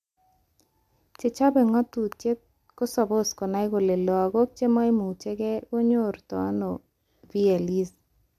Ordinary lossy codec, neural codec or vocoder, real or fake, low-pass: MP3, 96 kbps; none; real; 14.4 kHz